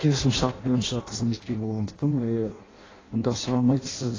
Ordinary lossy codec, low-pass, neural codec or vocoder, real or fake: AAC, 32 kbps; 7.2 kHz; codec, 16 kHz in and 24 kHz out, 0.6 kbps, FireRedTTS-2 codec; fake